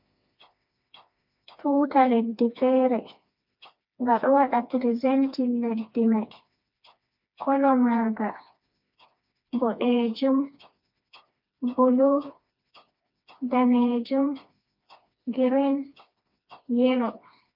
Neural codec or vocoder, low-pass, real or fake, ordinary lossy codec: codec, 16 kHz, 2 kbps, FreqCodec, smaller model; 5.4 kHz; fake; MP3, 48 kbps